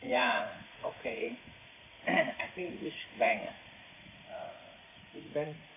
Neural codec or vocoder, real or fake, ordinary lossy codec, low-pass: vocoder, 44.1 kHz, 80 mel bands, Vocos; fake; AAC, 24 kbps; 3.6 kHz